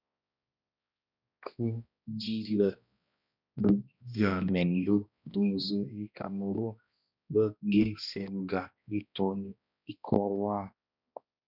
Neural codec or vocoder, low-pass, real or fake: codec, 16 kHz, 1 kbps, X-Codec, HuBERT features, trained on balanced general audio; 5.4 kHz; fake